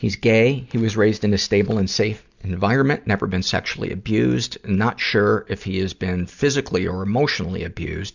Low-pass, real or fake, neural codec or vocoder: 7.2 kHz; real; none